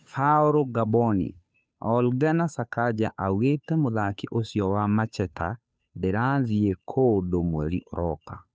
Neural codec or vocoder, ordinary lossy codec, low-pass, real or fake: codec, 16 kHz, 2 kbps, FunCodec, trained on Chinese and English, 25 frames a second; none; none; fake